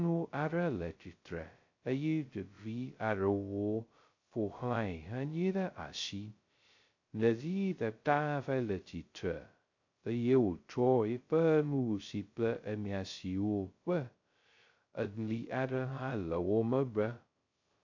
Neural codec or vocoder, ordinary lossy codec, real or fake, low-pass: codec, 16 kHz, 0.2 kbps, FocalCodec; AAC, 48 kbps; fake; 7.2 kHz